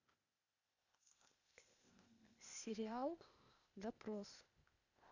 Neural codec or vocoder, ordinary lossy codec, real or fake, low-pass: codec, 16 kHz, 0.8 kbps, ZipCodec; none; fake; 7.2 kHz